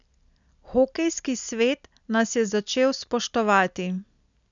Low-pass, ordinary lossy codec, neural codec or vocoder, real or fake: 7.2 kHz; none; none; real